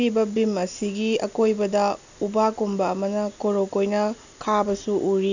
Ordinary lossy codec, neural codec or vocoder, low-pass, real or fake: none; none; 7.2 kHz; real